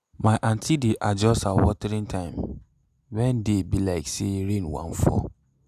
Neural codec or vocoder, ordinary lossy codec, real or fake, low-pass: none; none; real; 14.4 kHz